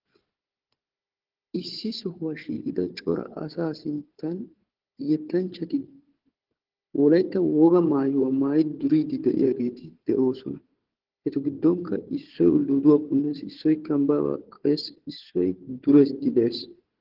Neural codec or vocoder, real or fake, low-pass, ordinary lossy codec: codec, 16 kHz, 4 kbps, FunCodec, trained on Chinese and English, 50 frames a second; fake; 5.4 kHz; Opus, 16 kbps